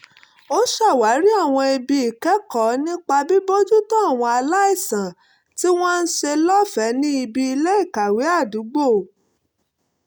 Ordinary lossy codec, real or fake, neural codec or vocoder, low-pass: none; real; none; none